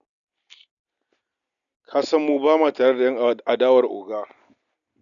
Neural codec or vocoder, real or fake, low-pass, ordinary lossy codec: none; real; 7.2 kHz; none